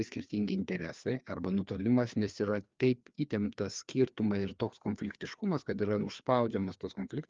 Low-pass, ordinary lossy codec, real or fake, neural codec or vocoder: 7.2 kHz; Opus, 32 kbps; fake; codec, 16 kHz, 2 kbps, FreqCodec, larger model